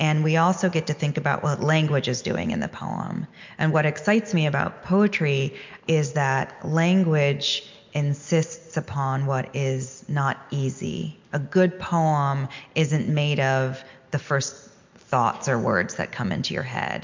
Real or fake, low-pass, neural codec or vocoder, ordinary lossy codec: real; 7.2 kHz; none; MP3, 64 kbps